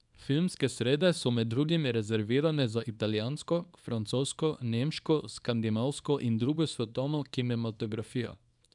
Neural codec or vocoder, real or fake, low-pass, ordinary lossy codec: codec, 24 kHz, 0.9 kbps, WavTokenizer, small release; fake; 10.8 kHz; none